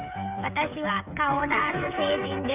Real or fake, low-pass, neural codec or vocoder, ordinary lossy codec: fake; 3.6 kHz; codec, 16 kHz, 8 kbps, FreqCodec, smaller model; none